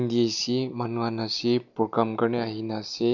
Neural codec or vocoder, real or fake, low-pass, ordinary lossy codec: none; real; 7.2 kHz; none